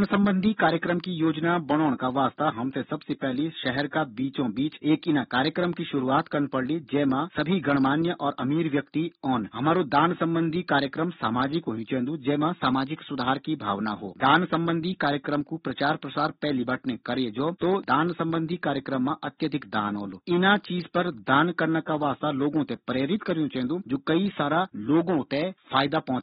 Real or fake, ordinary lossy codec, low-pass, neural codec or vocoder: real; AAC, 16 kbps; 19.8 kHz; none